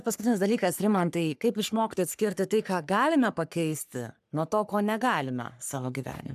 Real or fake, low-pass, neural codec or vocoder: fake; 14.4 kHz; codec, 44.1 kHz, 3.4 kbps, Pupu-Codec